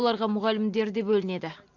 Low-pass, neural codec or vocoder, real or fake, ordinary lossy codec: 7.2 kHz; none; real; AAC, 48 kbps